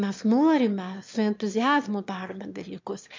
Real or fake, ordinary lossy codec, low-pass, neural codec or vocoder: fake; MP3, 64 kbps; 7.2 kHz; autoencoder, 22.05 kHz, a latent of 192 numbers a frame, VITS, trained on one speaker